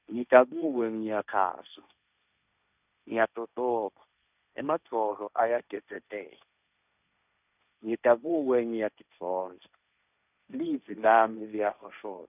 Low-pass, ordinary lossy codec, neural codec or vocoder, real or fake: 3.6 kHz; none; codec, 16 kHz, 1.1 kbps, Voila-Tokenizer; fake